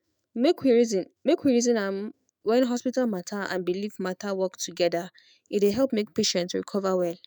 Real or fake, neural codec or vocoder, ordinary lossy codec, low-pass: fake; autoencoder, 48 kHz, 128 numbers a frame, DAC-VAE, trained on Japanese speech; none; none